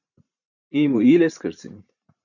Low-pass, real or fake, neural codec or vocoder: 7.2 kHz; fake; vocoder, 44.1 kHz, 128 mel bands every 512 samples, BigVGAN v2